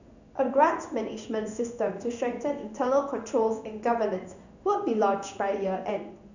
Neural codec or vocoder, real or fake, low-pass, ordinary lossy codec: codec, 16 kHz in and 24 kHz out, 1 kbps, XY-Tokenizer; fake; 7.2 kHz; none